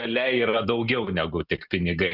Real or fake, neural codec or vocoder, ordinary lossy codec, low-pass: real; none; Opus, 64 kbps; 5.4 kHz